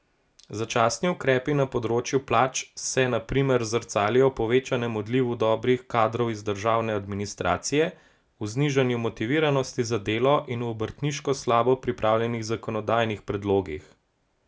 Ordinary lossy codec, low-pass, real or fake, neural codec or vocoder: none; none; real; none